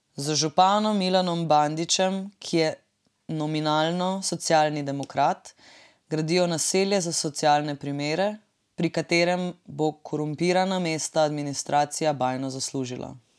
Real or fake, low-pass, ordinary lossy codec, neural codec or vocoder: real; none; none; none